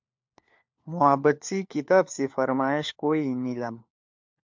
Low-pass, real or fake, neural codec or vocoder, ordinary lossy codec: 7.2 kHz; fake; codec, 16 kHz, 4 kbps, FunCodec, trained on LibriTTS, 50 frames a second; MP3, 64 kbps